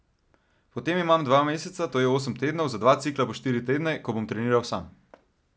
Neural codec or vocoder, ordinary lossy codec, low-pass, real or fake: none; none; none; real